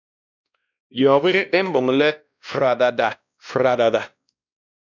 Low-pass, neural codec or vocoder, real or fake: 7.2 kHz; codec, 16 kHz, 1 kbps, X-Codec, WavLM features, trained on Multilingual LibriSpeech; fake